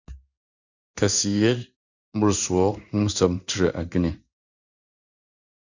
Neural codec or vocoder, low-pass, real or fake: codec, 16 kHz in and 24 kHz out, 1 kbps, XY-Tokenizer; 7.2 kHz; fake